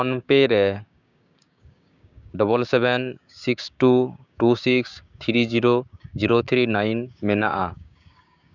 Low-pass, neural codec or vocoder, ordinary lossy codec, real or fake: 7.2 kHz; codec, 16 kHz, 6 kbps, DAC; none; fake